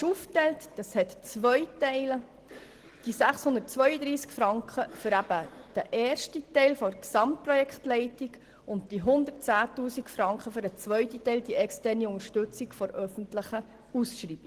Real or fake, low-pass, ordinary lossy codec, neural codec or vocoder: real; 14.4 kHz; Opus, 16 kbps; none